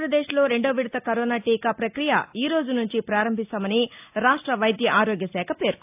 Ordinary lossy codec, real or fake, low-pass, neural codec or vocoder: AAC, 32 kbps; real; 3.6 kHz; none